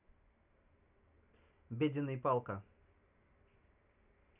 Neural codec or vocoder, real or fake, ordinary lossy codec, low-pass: none; real; none; 3.6 kHz